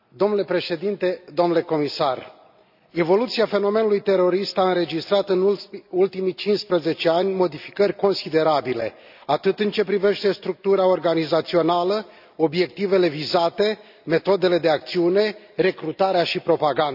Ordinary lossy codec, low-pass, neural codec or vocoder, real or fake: none; 5.4 kHz; none; real